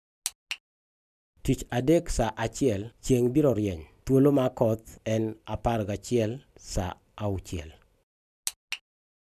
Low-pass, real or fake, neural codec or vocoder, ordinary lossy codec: 14.4 kHz; real; none; none